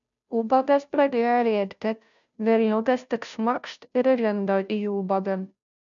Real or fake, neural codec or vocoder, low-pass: fake; codec, 16 kHz, 0.5 kbps, FunCodec, trained on Chinese and English, 25 frames a second; 7.2 kHz